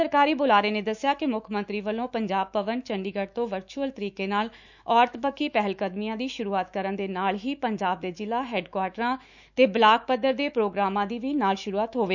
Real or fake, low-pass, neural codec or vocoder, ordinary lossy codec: fake; 7.2 kHz; autoencoder, 48 kHz, 128 numbers a frame, DAC-VAE, trained on Japanese speech; none